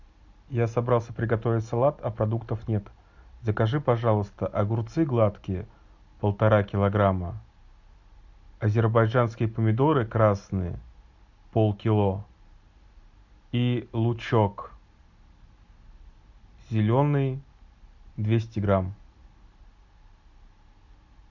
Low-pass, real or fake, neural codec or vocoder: 7.2 kHz; real; none